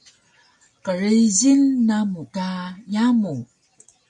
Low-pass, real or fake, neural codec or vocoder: 10.8 kHz; real; none